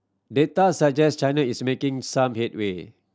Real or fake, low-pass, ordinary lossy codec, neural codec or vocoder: real; none; none; none